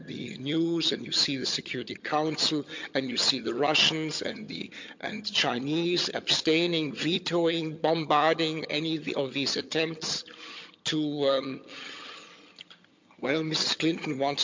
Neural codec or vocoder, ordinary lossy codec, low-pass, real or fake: vocoder, 22.05 kHz, 80 mel bands, HiFi-GAN; MP3, 48 kbps; 7.2 kHz; fake